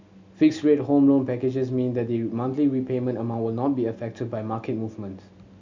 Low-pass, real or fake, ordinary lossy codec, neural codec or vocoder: 7.2 kHz; real; MP3, 64 kbps; none